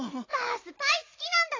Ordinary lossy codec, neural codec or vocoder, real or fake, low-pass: AAC, 32 kbps; none; real; 7.2 kHz